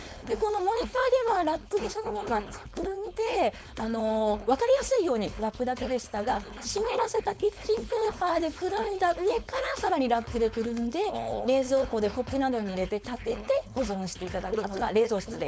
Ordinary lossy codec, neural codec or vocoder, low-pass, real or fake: none; codec, 16 kHz, 4.8 kbps, FACodec; none; fake